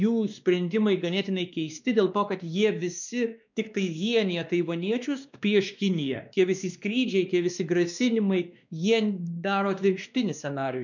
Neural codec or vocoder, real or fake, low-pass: codec, 16 kHz, 2 kbps, X-Codec, WavLM features, trained on Multilingual LibriSpeech; fake; 7.2 kHz